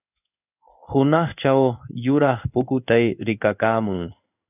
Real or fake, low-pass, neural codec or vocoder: fake; 3.6 kHz; codec, 16 kHz in and 24 kHz out, 1 kbps, XY-Tokenizer